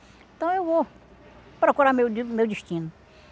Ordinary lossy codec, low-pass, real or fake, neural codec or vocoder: none; none; real; none